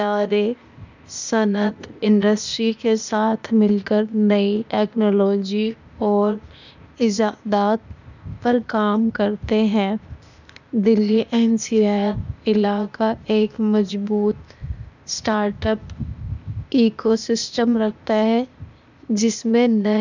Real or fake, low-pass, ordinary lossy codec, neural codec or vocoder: fake; 7.2 kHz; none; codec, 16 kHz, 0.8 kbps, ZipCodec